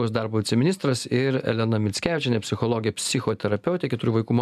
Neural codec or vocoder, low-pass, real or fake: none; 14.4 kHz; real